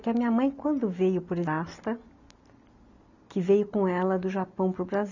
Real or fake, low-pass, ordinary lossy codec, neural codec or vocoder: real; 7.2 kHz; none; none